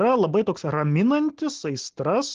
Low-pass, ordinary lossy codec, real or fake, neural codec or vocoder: 7.2 kHz; Opus, 16 kbps; real; none